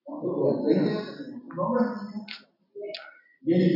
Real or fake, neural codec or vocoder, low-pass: real; none; 5.4 kHz